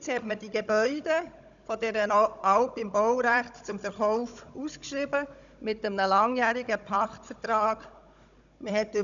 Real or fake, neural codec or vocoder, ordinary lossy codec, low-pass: fake; codec, 16 kHz, 16 kbps, FunCodec, trained on Chinese and English, 50 frames a second; none; 7.2 kHz